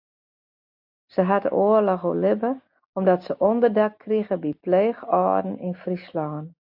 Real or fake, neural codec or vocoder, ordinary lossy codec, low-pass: real; none; AAC, 48 kbps; 5.4 kHz